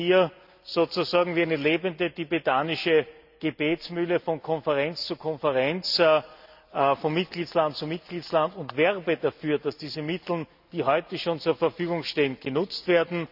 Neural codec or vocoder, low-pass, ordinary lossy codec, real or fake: none; 5.4 kHz; none; real